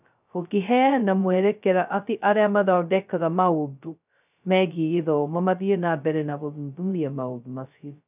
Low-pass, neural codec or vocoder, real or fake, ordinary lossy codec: 3.6 kHz; codec, 16 kHz, 0.2 kbps, FocalCodec; fake; none